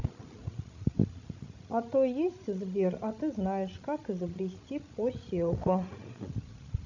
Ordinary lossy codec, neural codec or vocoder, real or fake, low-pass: none; codec, 16 kHz, 16 kbps, FreqCodec, larger model; fake; 7.2 kHz